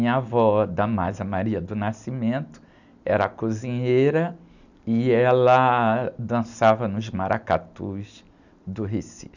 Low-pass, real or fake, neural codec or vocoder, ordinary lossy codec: 7.2 kHz; real; none; none